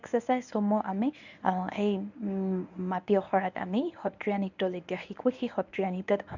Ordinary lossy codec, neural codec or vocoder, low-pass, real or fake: none; codec, 24 kHz, 0.9 kbps, WavTokenizer, medium speech release version 1; 7.2 kHz; fake